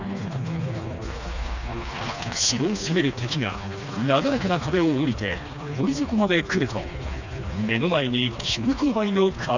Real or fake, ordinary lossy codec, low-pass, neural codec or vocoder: fake; none; 7.2 kHz; codec, 16 kHz, 2 kbps, FreqCodec, smaller model